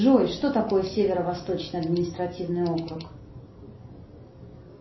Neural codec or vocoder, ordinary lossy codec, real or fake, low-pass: none; MP3, 24 kbps; real; 7.2 kHz